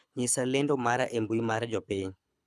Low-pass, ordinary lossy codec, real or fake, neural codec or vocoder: none; none; fake; codec, 24 kHz, 6 kbps, HILCodec